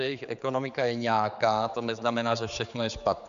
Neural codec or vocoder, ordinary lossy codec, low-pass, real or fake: codec, 16 kHz, 4 kbps, X-Codec, HuBERT features, trained on general audio; AAC, 96 kbps; 7.2 kHz; fake